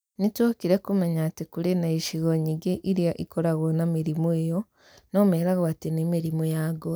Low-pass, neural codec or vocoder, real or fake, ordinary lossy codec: none; none; real; none